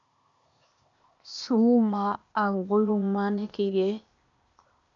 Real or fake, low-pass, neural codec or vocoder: fake; 7.2 kHz; codec, 16 kHz, 0.8 kbps, ZipCodec